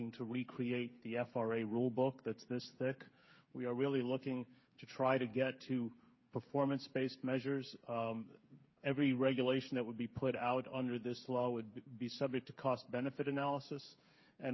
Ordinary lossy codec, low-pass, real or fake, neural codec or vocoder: MP3, 24 kbps; 7.2 kHz; fake; codec, 16 kHz, 8 kbps, FreqCodec, smaller model